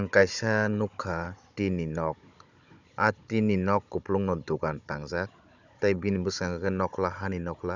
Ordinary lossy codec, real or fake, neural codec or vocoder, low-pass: none; fake; codec, 16 kHz, 16 kbps, FunCodec, trained on Chinese and English, 50 frames a second; 7.2 kHz